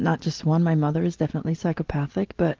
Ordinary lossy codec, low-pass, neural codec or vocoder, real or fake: Opus, 16 kbps; 7.2 kHz; none; real